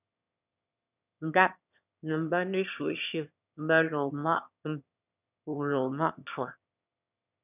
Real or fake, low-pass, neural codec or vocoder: fake; 3.6 kHz; autoencoder, 22.05 kHz, a latent of 192 numbers a frame, VITS, trained on one speaker